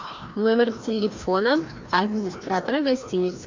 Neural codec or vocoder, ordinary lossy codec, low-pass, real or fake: codec, 16 kHz, 1 kbps, FreqCodec, larger model; MP3, 64 kbps; 7.2 kHz; fake